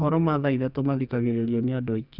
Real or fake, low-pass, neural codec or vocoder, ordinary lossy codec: fake; 5.4 kHz; codec, 44.1 kHz, 2.6 kbps, SNAC; none